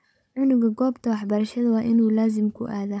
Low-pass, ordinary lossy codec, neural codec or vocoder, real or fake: none; none; codec, 16 kHz, 16 kbps, FunCodec, trained on Chinese and English, 50 frames a second; fake